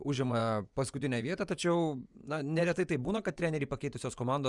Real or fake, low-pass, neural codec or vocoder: fake; 10.8 kHz; vocoder, 24 kHz, 100 mel bands, Vocos